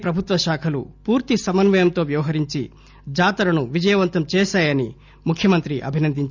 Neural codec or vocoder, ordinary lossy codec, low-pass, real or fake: none; none; 7.2 kHz; real